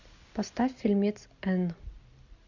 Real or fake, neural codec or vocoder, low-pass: real; none; 7.2 kHz